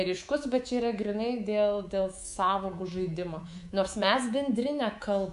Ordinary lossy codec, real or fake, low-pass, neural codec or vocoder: AAC, 96 kbps; fake; 10.8 kHz; codec, 24 kHz, 3.1 kbps, DualCodec